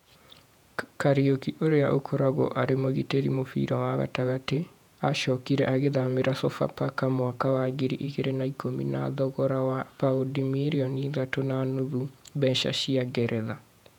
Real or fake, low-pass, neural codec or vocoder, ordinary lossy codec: fake; 19.8 kHz; vocoder, 48 kHz, 128 mel bands, Vocos; none